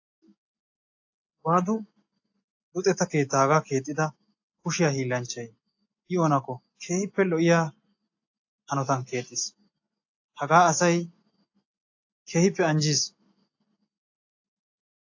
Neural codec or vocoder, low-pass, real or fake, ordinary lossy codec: none; 7.2 kHz; real; AAC, 48 kbps